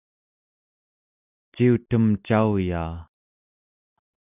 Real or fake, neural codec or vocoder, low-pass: real; none; 3.6 kHz